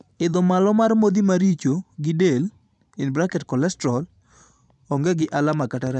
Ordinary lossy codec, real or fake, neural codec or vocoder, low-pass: none; fake; vocoder, 44.1 kHz, 128 mel bands every 512 samples, BigVGAN v2; 10.8 kHz